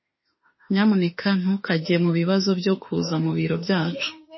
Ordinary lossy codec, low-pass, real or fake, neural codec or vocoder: MP3, 24 kbps; 7.2 kHz; fake; autoencoder, 48 kHz, 32 numbers a frame, DAC-VAE, trained on Japanese speech